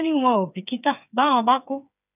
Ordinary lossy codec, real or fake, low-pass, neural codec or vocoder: none; fake; 3.6 kHz; codec, 16 kHz, 4 kbps, FreqCodec, smaller model